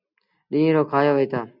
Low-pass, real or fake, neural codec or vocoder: 5.4 kHz; real; none